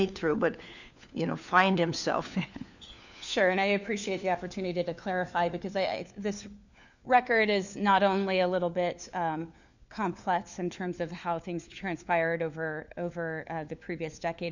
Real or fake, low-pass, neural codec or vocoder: fake; 7.2 kHz; codec, 16 kHz, 4 kbps, FunCodec, trained on LibriTTS, 50 frames a second